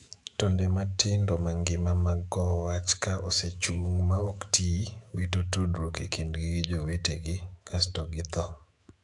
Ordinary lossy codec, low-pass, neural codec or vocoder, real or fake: AAC, 64 kbps; 10.8 kHz; autoencoder, 48 kHz, 128 numbers a frame, DAC-VAE, trained on Japanese speech; fake